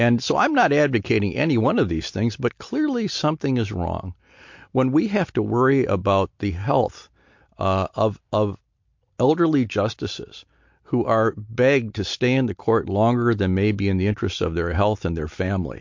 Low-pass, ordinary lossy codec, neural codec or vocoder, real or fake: 7.2 kHz; MP3, 48 kbps; none; real